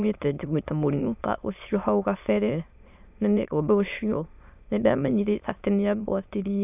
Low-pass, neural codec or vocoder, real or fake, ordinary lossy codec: 3.6 kHz; autoencoder, 22.05 kHz, a latent of 192 numbers a frame, VITS, trained on many speakers; fake; none